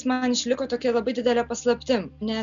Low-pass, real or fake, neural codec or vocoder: 7.2 kHz; real; none